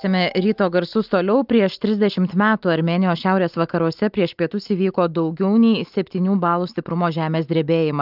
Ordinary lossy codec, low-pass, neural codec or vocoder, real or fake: Opus, 24 kbps; 5.4 kHz; none; real